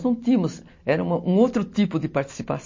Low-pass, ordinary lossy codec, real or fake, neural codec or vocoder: 7.2 kHz; MP3, 32 kbps; real; none